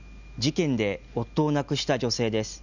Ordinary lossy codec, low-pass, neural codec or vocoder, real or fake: none; 7.2 kHz; none; real